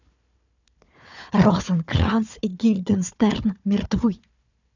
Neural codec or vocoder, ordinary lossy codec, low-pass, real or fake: codec, 16 kHz, 4 kbps, FunCodec, trained on Chinese and English, 50 frames a second; none; 7.2 kHz; fake